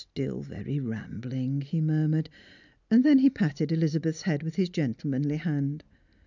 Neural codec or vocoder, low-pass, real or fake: none; 7.2 kHz; real